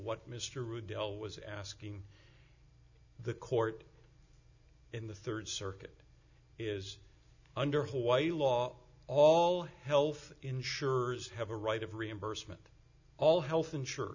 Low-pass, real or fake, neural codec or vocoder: 7.2 kHz; real; none